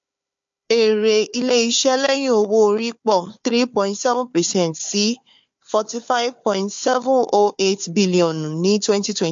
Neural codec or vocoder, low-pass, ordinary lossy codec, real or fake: codec, 16 kHz, 4 kbps, FunCodec, trained on Chinese and English, 50 frames a second; 7.2 kHz; MP3, 48 kbps; fake